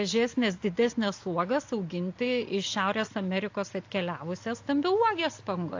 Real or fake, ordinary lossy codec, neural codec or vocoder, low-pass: real; AAC, 48 kbps; none; 7.2 kHz